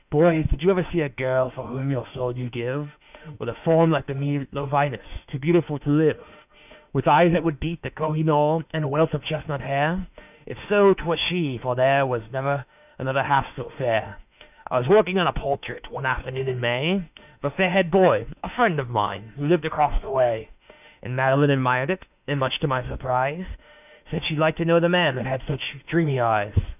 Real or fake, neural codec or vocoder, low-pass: fake; autoencoder, 48 kHz, 32 numbers a frame, DAC-VAE, trained on Japanese speech; 3.6 kHz